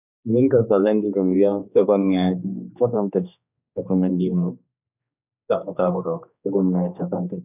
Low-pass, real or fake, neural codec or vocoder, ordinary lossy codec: 3.6 kHz; fake; codec, 16 kHz, 2 kbps, X-Codec, HuBERT features, trained on general audio; none